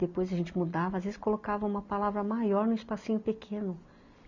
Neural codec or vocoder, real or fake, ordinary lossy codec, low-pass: none; real; none; 7.2 kHz